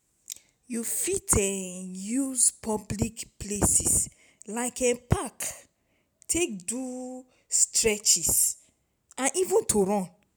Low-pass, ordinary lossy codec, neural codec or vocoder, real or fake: none; none; none; real